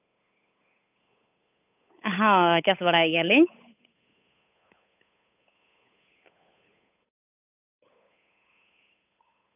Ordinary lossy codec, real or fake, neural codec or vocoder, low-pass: none; fake; codec, 16 kHz, 8 kbps, FunCodec, trained on Chinese and English, 25 frames a second; 3.6 kHz